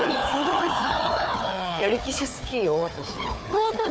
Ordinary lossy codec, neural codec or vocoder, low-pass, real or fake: none; codec, 16 kHz, 4 kbps, FunCodec, trained on Chinese and English, 50 frames a second; none; fake